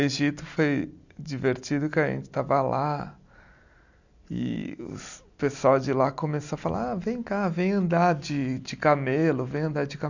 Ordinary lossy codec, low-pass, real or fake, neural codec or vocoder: none; 7.2 kHz; real; none